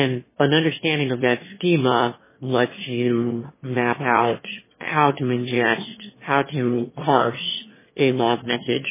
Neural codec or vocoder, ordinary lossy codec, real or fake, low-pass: autoencoder, 22.05 kHz, a latent of 192 numbers a frame, VITS, trained on one speaker; MP3, 16 kbps; fake; 3.6 kHz